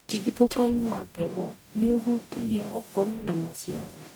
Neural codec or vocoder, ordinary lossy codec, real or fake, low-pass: codec, 44.1 kHz, 0.9 kbps, DAC; none; fake; none